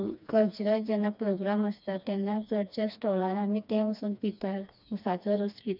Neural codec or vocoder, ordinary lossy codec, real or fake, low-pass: codec, 16 kHz, 2 kbps, FreqCodec, smaller model; none; fake; 5.4 kHz